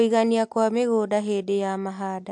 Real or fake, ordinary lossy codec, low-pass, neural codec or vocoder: real; AAC, 64 kbps; 10.8 kHz; none